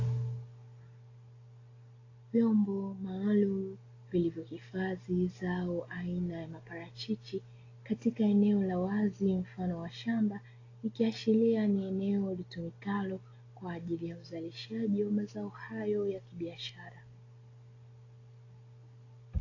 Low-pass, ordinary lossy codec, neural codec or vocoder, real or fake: 7.2 kHz; AAC, 32 kbps; none; real